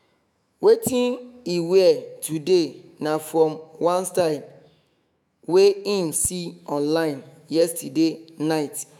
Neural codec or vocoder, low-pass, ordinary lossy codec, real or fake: autoencoder, 48 kHz, 128 numbers a frame, DAC-VAE, trained on Japanese speech; 19.8 kHz; none; fake